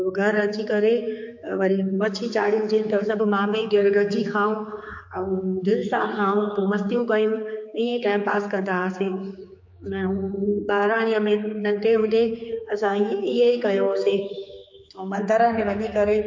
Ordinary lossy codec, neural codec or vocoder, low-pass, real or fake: MP3, 48 kbps; codec, 16 kHz, 4 kbps, X-Codec, HuBERT features, trained on general audio; 7.2 kHz; fake